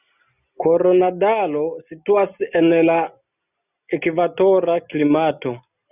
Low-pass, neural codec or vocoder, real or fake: 3.6 kHz; none; real